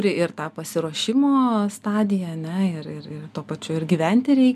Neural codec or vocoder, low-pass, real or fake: none; 14.4 kHz; real